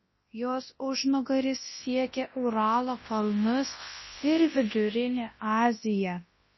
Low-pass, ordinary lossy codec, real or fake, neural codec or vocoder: 7.2 kHz; MP3, 24 kbps; fake; codec, 24 kHz, 0.9 kbps, WavTokenizer, large speech release